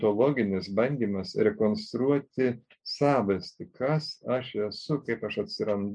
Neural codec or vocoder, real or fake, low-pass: none; real; 9.9 kHz